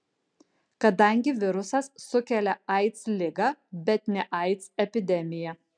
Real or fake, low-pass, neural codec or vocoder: real; 9.9 kHz; none